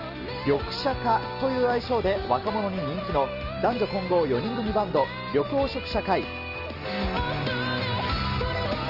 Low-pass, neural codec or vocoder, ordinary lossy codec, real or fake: 5.4 kHz; none; Opus, 24 kbps; real